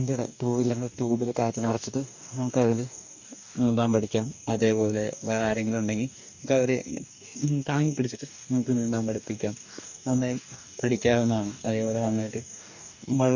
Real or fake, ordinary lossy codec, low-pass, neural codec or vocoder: fake; none; 7.2 kHz; codec, 44.1 kHz, 2.6 kbps, DAC